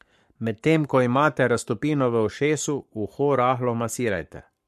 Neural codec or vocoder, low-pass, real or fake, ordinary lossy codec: codec, 44.1 kHz, 7.8 kbps, Pupu-Codec; 14.4 kHz; fake; MP3, 64 kbps